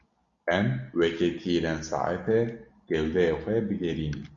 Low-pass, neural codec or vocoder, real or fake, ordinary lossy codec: 7.2 kHz; none; real; Opus, 64 kbps